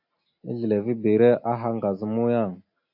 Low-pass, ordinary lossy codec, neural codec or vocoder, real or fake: 5.4 kHz; AAC, 48 kbps; none; real